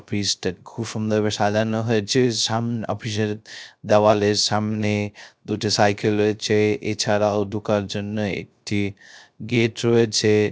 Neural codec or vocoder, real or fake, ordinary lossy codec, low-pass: codec, 16 kHz, 0.3 kbps, FocalCodec; fake; none; none